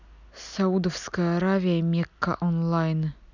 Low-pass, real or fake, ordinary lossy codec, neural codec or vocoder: 7.2 kHz; real; none; none